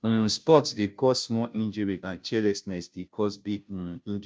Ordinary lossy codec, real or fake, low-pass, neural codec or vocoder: none; fake; none; codec, 16 kHz, 0.5 kbps, FunCodec, trained on Chinese and English, 25 frames a second